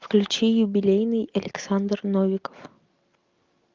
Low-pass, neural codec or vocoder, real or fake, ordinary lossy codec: 7.2 kHz; none; real; Opus, 24 kbps